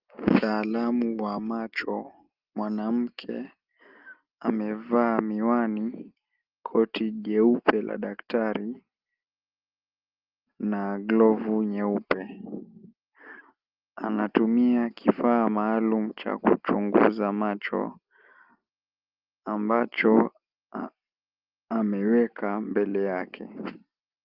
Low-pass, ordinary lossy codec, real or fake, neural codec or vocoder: 5.4 kHz; Opus, 32 kbps; real; none